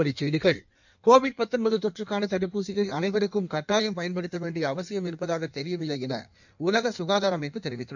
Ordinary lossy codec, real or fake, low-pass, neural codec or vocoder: none; fake; 7.2 kHz; codec, 16 kHz in and 24 kHz out, 1.1 kbps, FireRedTTS-2 codec